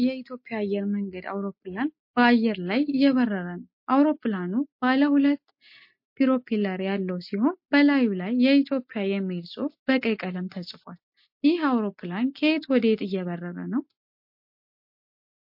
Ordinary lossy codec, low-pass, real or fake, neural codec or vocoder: MP3, 32 kbps; 5.4 kHz; real; none